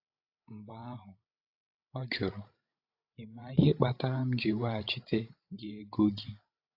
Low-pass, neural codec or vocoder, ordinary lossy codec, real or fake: 5.4 kHz; codec, 16 kHz, 16 kbps, FreqCodec, larger model; AAC, 32 kbps; fake